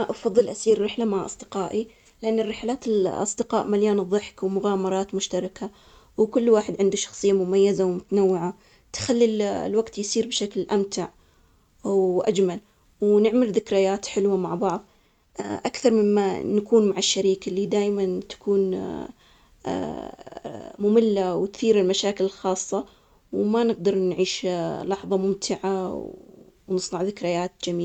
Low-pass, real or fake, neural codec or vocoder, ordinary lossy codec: 19.8 kHz; real; none; none